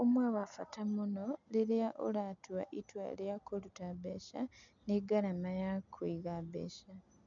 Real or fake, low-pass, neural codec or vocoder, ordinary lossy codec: real; 7.2 kHz; none; none